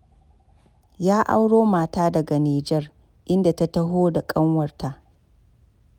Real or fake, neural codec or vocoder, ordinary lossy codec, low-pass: real; none; none; 19.8 kHz